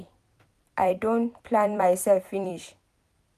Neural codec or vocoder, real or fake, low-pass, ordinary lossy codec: vocoder, 44.1 kHz, 128 mel bands every 256 samples, BigVGAN v2; fake; 14.4 kHz; none